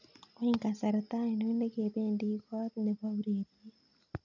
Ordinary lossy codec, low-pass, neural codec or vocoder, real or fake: none; 7.2 kHz; none; real